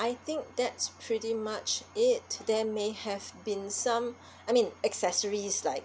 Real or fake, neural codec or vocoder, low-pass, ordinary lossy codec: real; none; none; none